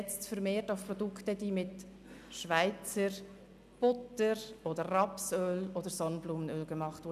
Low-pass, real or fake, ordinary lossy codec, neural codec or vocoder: 14.4 kHz; real; none; none